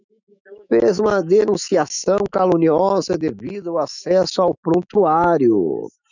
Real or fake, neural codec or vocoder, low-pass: fake; autoencoder, 48 kHz, 128 numbers a frame, DAC-VAE, trained on Japanese speech; 7.2 kHz